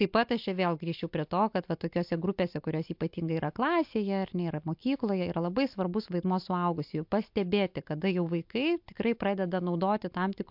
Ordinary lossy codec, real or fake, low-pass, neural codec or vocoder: MP3, 48 kbps; real; 5.4 kHz; none